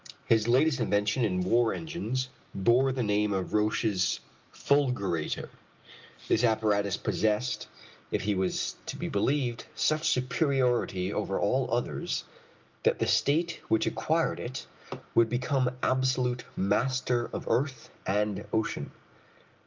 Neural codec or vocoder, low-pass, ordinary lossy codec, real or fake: none; 7.2 kHz; Opus, 32 kbps; real